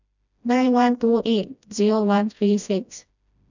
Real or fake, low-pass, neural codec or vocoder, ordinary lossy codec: fake; 7.2 kHz; codec, 16 kHz, 1 kbps, FreqCodec, smaller model; none